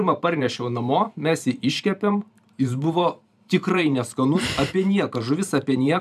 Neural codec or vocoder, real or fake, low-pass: vocoder, 44.1 kHz, 128 mel bands every 512 samples, BigVGAN v2; fake; 14.4 kHz